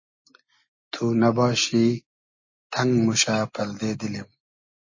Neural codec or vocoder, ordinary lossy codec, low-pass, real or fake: none; MP3, 32 kbps; 7.2 kHz; real